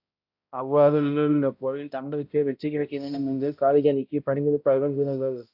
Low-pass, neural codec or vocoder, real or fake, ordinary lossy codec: 5.4 kHz; codec, 16 kHz, 0.5 kbps, X-Codec, HuBERT features, trained on balanced general audio; fake; AAC, 48 kbps